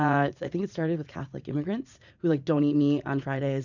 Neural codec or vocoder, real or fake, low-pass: vocoder, 44.1 kHz, 80 mel bands, Vocos; fake; 7.2 kHz